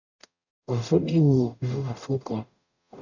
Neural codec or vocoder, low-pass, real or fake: codec, 44.1 kHz, 0.9 kbps, DAC; 7.2 kHz; fake